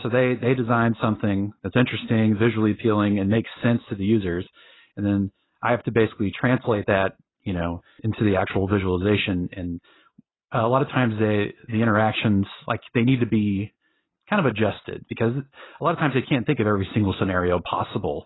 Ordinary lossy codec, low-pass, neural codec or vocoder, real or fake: AAC, 16 kbps; 7.2 kHz; none; real